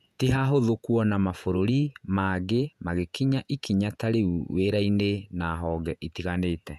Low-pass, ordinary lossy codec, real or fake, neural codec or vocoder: 14.4 kHz; none; fake; vocoder, 48 kHz, 128 mel bands, Vocos